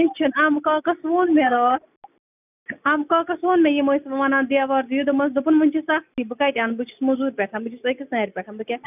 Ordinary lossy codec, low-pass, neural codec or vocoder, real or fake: none; 3.6 kHz; none; real